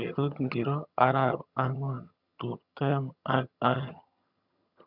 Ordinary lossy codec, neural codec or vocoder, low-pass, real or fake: none; vocoder, 22.05 kHz, 80 mel bands, HiFi-GAN; 5.4 kHz; fake